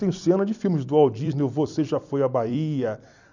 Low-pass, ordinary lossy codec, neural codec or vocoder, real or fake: 7.2 kHz; none; vocoder, 44.1 kHz, 128 mel bands every 256 samples, BigVGAN v2; fake